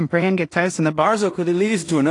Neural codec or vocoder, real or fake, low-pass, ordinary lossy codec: codec, 16 kHz in and 24 kHz out, 0.4 kbps, LongCat-Audio-Codec, two codebook decoder; fake; 10.8 kHz; AAC, 48 kbps